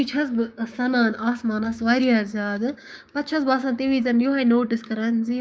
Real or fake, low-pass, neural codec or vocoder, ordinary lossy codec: fake; none; codec, 16 kHz, 6 kbps, DAC; none